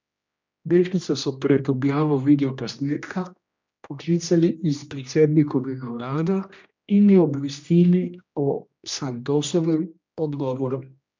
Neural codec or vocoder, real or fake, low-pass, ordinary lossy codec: codec, 16 kHz, 1 kbps, X-Codec, HuBERT features, trained on general audio; fake; 7.2 kHz; MP3, 64 kbps